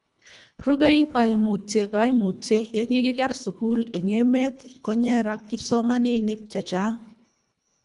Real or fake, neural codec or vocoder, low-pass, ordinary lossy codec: fake; codec, 24 kHz, 1.5 kbps, HILCodec; 10.8 kHz; none